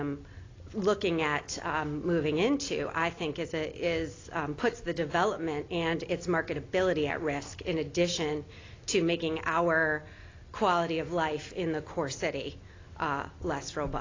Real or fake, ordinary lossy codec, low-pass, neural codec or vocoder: real; AAC, 32 kbps; 7.2 kHz; none